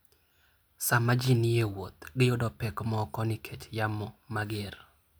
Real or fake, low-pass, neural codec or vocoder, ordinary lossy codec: real; none; none; none